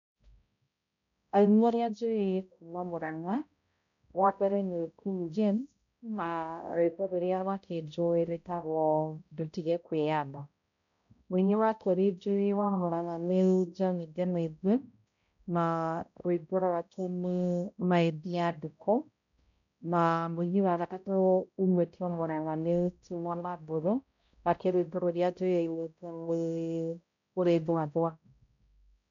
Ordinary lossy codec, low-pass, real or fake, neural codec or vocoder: none; 7.2 kHz; fake; codec, 16 kHz, 0.5 kbps, X-Codec, HuBERT features, trained on balanced general audio